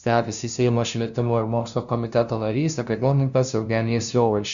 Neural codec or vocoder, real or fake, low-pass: codec, 16 kHz, 0.5 kbps, FunCodec, trained on LibriTTS, 25 frames a second; fake; 7.2 kHz